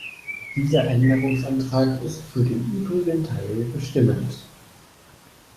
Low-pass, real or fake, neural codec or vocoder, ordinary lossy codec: 14.4 kHz; fake; codec, 44.1 kHz, 7.8 kbps, DAC; Opus, 64 kbps